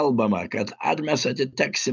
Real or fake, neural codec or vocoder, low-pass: real; none; 7.2 kHz